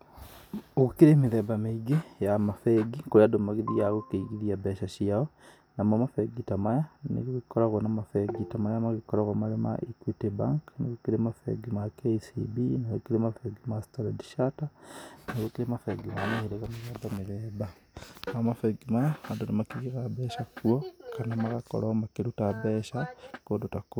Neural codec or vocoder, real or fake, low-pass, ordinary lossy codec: none; real; none; none